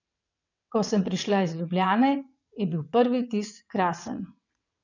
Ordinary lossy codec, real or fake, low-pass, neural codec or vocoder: none; fake; 7.2 kHz; vocoder, 22.05 kHz, 80 mel bands, WaveNeXt